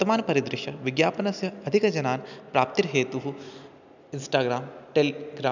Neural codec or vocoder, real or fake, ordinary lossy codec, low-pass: none; real; none; 7.2 kHz